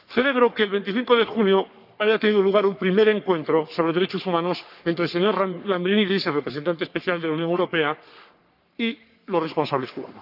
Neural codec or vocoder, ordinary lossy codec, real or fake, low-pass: codec, 44.1 kHz, 3.4 kbps, Pupu-Codec; none; fake; 5.4 kHz